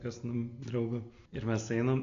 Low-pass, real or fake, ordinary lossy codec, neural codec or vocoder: 7.2 kHz; real; AAC, 64 kbps; none